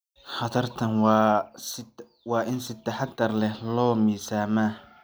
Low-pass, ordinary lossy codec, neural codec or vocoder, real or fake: none; none; none; real